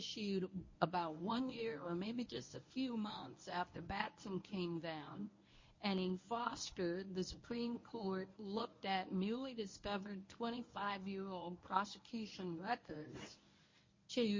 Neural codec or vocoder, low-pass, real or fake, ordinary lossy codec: codec, 24 kHz, 0.9 kbps, WavTokenizer, medium speech release version 1; 7.2 kHz; fake; MP3, 32 kbps